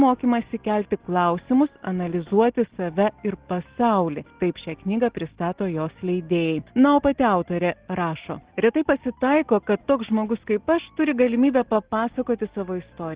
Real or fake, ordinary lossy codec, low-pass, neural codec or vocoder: real; Opus, 16 kbps; 3.6 kHz; none